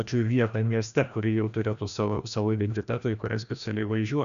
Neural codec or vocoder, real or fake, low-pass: codec, 16 kHz, 1 kbps, FreqCodec, larger model; fake; 7.2 kHz